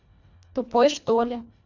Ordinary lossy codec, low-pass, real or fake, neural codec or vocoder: none; 7.2 kHz; fake; codec, 24 kHz, 1.5 kbps, HILCodec